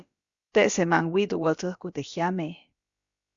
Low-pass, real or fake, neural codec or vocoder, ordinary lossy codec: 7.2 kHz; fake; codec, 16 kHz, about 1 kbps, DyCAST, with the encoder's durations; Opus, 64 kbps